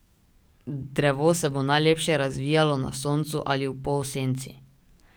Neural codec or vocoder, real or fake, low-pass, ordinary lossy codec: codec, 44.1 kHz, 7.8 kbps, DAC; fake; none; none